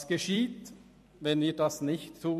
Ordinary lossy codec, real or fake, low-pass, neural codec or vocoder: MP3, 64 kbps; fake; 14.4 kHz; vocoder, 44.1 kHz, 128 mel bands every 256 samples, BigVGAN v2